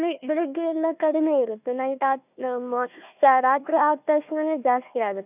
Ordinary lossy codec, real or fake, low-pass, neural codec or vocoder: none; fake; 3.6 kHz; codec, 16 kHz, 1 kbps, FunCodec, trained on Chinese and English, 50 frames a second